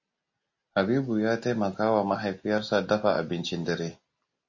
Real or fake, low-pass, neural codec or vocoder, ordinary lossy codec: real; 7.2 kHz; none; MP3, 32 kbps